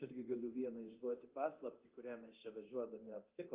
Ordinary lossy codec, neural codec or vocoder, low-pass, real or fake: Opus, 32 kbps; codec, 24 kHz, 0.9 kbps, DualCodec; 3.6 kHz; fake